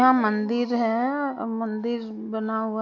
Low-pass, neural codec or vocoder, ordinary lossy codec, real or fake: 7.2 kHz; none; none; real